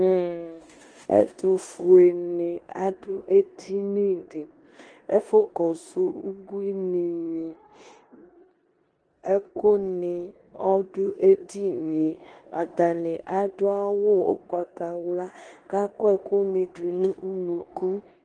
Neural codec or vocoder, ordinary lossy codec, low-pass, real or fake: codec, 16 kHz in and 24 kHz out, 0.9 kbps, LongCat-Audio-Codec, four codebook decoder; Opus, 24 kbps; 9.9 kHz; fake